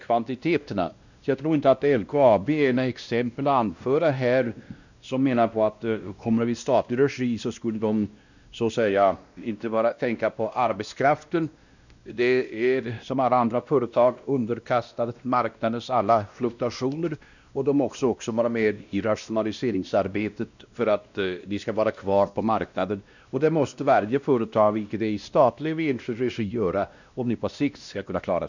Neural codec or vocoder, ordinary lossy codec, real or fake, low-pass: codec, 16 kHz, 1 kbps, X-Codec, WavLM features, trained on Multilingual LibriSpeech; none; fake; 7.2 kHz